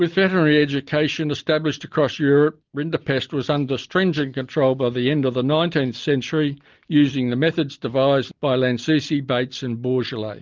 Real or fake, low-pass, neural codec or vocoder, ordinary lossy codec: real; 7.2 kHz; none; Opus, 24 kbps